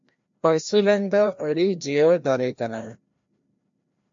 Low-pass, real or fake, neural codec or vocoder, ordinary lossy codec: 7.2 kHz; fake; codec, 16 kHz, 1 kbps, FreqCodec, larger model; MP3, 48 kbps